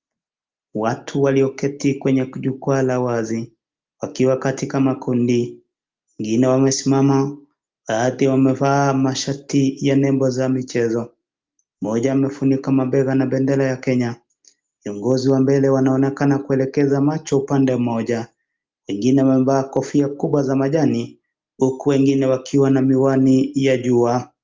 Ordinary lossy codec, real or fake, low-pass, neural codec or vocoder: Opus, 32 kbps; real; 7.2 kHz; none